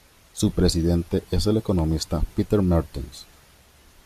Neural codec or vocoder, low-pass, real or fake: none; 14.4 kHz; real